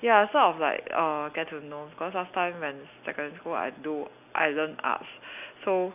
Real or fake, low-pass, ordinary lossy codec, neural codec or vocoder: real; 3.6 kHz; none; none